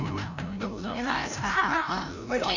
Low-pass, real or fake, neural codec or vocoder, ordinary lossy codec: 7.2 kHz; fake; codec, 16 kHz, 0.5 kbps, FreqCodec, larger model; none